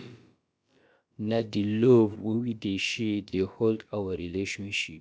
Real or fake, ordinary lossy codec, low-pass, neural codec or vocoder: fake; none; none; codec, 16 kHz, about 1 kbps, DyCAST, with the encoder's durations